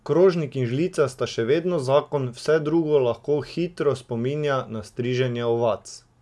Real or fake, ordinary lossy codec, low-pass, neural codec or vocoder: real; none; none; none